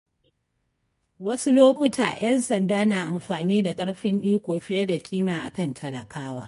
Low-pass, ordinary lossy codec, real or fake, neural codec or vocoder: 10.8 kHz; MP3, 48 kbps; fake; codec, 24 kHz, 0.9 kbps, WavTokenizer, medium music audio release